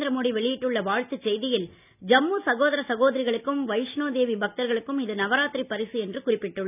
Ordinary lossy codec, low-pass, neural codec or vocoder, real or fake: none; 3.6 kHz; none; real